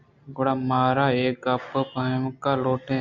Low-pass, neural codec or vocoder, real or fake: 7.2 kHz; none; real